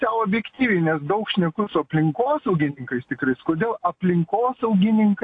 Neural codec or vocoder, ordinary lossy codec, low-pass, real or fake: none; AAC, 48 kbps; 9.9 kHz; real